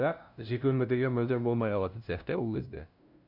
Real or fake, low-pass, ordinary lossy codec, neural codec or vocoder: fake; 5.4 kHz; none; codec, 16 kHz, 0.5 kbps, FunCodec, trained on LibriTTS, 25 frames a second